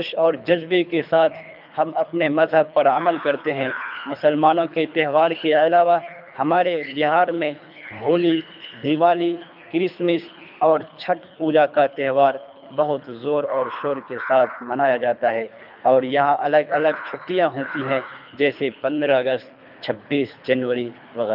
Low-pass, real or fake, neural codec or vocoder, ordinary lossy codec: 5.4 kHz; fake; codec, 24 kHz, 3 kbps, HILCodec; none